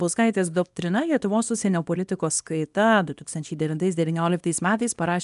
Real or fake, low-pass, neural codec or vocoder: fake; 10.8 kHz; codec, 24 kHz, 0.9 kbps, WavTokenizer, small release